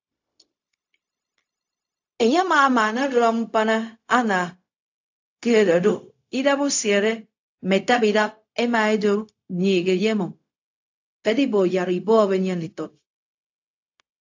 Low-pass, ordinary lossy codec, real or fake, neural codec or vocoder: 7.2 kHz; AAC, 48 kbps; fake; codec, 16 kHz, 0.4 kbps, LongCat-Audio-Codec